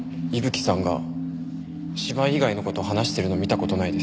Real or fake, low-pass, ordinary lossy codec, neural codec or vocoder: real; none; none; none